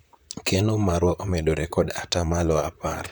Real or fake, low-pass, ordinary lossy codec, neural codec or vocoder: fake; none; none; vocoder, 44.1 kHz, 128 mel bands, Pupu-Vocoder